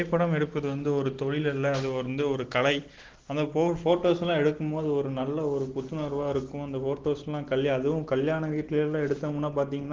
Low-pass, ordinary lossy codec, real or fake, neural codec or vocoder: 7.2 kHz; Opus, 16 kbps; real; none